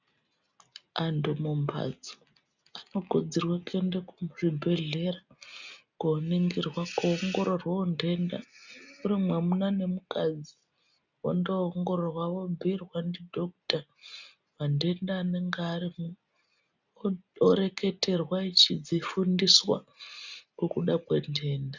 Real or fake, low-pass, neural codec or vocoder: real; 7.2 kHz; none